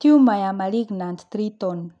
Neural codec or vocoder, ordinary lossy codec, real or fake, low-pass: none; none; real; 9.9 kHz